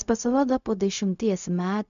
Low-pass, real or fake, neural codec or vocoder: 7.2 kHz; fake; codec, 16 kHz, 0.4 kbps, LongCat-Audio-Codec